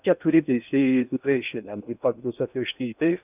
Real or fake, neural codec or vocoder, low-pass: fake; codec, 16 kHz in and 24 kHz out, 0.6 kbps, FocalCodec, streaming, 4096 codes; 3.6 kHz